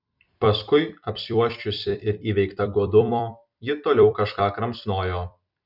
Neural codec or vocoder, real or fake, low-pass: vocoder, 44.1 kHz, 128 mel bands every 256 samples, BigVGAN v2; fake; 5.4 kHz